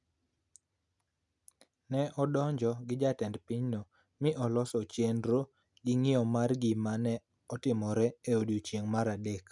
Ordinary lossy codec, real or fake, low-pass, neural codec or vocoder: none; real; 10.8 kHz; none